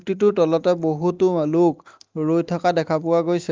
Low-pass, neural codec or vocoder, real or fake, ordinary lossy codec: 7.2 kHz; none; real; Opus, 24 kbps